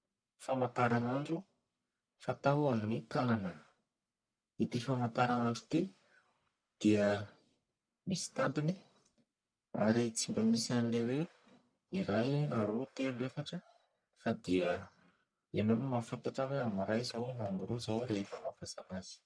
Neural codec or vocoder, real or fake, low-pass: codec, 44.1 kHz, 1.7 kbps, Pupu-Codec; fake; 9.9 kHz